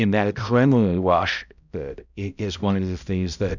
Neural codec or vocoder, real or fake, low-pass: codec, 16 kHz, 0.5 kbps, X-Codec, HuBERT features, trained on balanced general audio; fake; 7.2 kHz